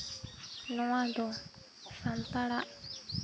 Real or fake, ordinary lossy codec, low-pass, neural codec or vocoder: real; none; none; none